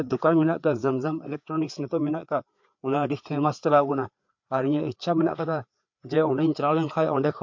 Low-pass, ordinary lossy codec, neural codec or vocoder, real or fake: 7.2 kHz; MP3, 48 kbps; codec, 16 kHz, 4 kbps, FreqCodec, larger model; fake